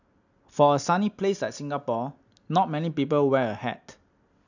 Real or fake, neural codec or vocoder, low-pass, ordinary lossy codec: real; none; 7.2 kHz; none